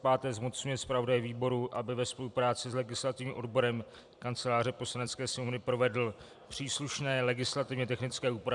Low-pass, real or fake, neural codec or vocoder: 10.8 kHz; fake; vocoder, 44.1 kHz, 128 mel bands every 256 samples, BigVGAN v2